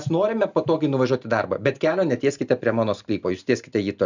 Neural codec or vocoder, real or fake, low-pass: none; real; 7.2 kHz